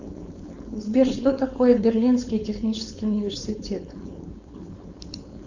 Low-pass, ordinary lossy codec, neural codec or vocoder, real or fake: 7.2 kHz; Opus, 64 kbps; codec, 16 kHz, 4.8 kbps, FACodec; fake